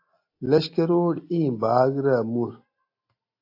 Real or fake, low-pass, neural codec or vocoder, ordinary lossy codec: real; 5.4 kHz; none; AAC, 32 kbps